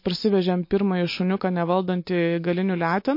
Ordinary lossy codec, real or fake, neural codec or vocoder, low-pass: MP3, 32 kbps; real; none; 5.4 kHz